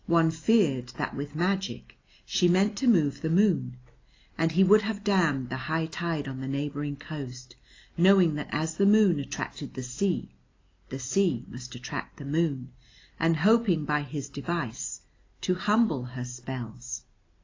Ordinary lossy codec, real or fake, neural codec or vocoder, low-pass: AAC, 32 kbps; real; none; 7.2 kHz